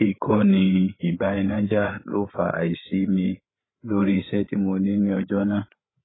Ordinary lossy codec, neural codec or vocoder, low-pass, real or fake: AAC, 16 kbps; codec, 16 kHz, 8 kbps, FreqCodec, larger model; 7.2 kHz; fake